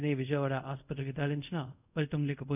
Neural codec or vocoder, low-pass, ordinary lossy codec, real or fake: codec, 24 kHz, 0.5 kbps, DualCodec; 3.6 kHz; none; fake